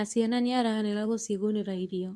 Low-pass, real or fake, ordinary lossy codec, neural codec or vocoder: none; fake; none; codec, 24 kHz, 0.9 kbps, WavTokenizer, medium speech release version 2